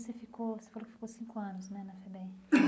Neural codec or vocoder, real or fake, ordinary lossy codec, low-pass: none; real; none; none